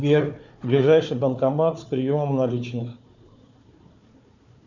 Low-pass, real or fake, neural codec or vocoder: 7.2 kHz; fake; codec, 16 kHz, 4 kbps, FunCodec, trained on Chinese and English, 50 frames a second